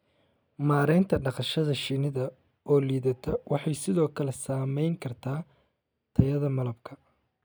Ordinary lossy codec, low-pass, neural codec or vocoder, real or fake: none; none; none; real